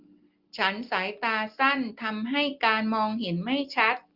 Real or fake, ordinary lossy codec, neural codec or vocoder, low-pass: real; Opus, 32 kbps; none; 5.4 kHz